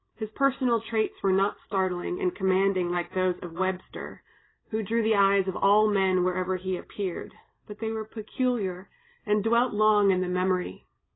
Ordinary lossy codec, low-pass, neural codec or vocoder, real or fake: AAC, 16 kbps; 7.2 kHz; none; real